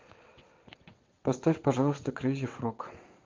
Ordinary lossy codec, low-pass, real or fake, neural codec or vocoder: Opus, 32 kbps; 7.2 kHz; fake; vocoder, 22.05 kHz, 80 mel bands, WaveNeXt